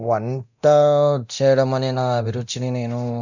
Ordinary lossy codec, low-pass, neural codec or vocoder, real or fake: none; 7.2 kHz; codec, 24 kHz, 0.9 kbps, DualCodec; fake